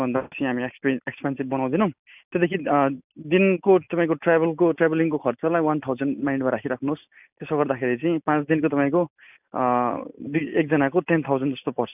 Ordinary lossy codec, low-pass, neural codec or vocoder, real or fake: none; 3.6 kHz; none; real